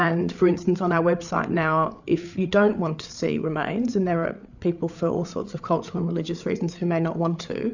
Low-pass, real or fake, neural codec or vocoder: 7.2 kHz; fake; codec, 16 kHz, 8 kbps, FreqCodec, larger model